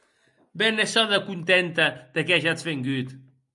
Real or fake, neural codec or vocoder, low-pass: real; none; 10.8 kHz